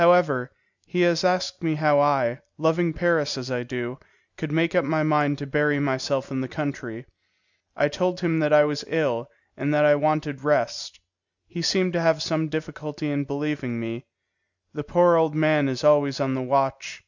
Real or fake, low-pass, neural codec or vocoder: real; 7.2 kHz; none